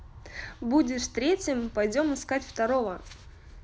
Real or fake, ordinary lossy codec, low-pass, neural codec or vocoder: real; none; none; none